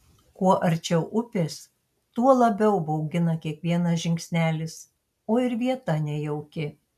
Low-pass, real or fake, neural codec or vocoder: 14.4 kHz; real; none